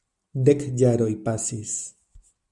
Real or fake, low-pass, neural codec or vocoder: real; 10.8 kHz; none